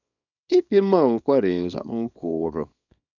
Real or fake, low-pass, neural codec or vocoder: fake; 7.2 kHz; codec, 24 kHz, 0.9 kbps, WavTokenizer, small release